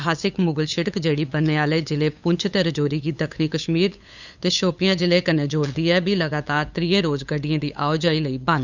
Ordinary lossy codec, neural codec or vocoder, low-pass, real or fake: none; codec, 16 kHz, 8 kbps, FunCodec, trained on LibriTTS, 25 frames a second; 7.2 kHz; fake